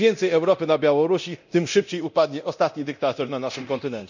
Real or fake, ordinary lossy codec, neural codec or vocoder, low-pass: fake; none; codec, 24 kHz, 0.9 kbps, DualCodec; 7.2 kHz